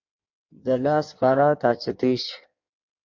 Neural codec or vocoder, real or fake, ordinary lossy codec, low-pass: codec, 16 kHz in and 24 kHz out, 1.1 kbps, FireRedTTS-2 codec; fake; MP3, 48 kbps; 7.2 kHz